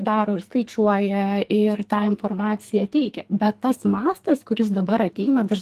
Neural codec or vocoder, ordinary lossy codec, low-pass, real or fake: codec, 44.1 kHz, 2.6 kbps, SNAC; Opus, 24 kbps; 14.4 kHz; fake